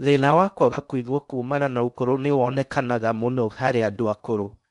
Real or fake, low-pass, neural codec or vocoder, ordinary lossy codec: fake; 10.8 kHz; codec, 16 kHz in and 24 kHz out, 0.6 kbps, FocalCodec, streaming, 2048 codes; none